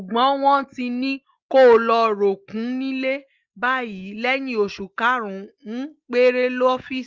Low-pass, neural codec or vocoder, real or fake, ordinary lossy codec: 7.2 kHz; none; real; Opus, 32 kbps